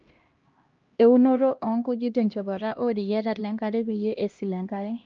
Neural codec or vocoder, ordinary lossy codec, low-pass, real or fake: codec, 16 kHz, 1 kbps, X-Codec, HuBERT features, trained on LibriSpeech; Opus, 24 kbps; 7.2 kHz; fake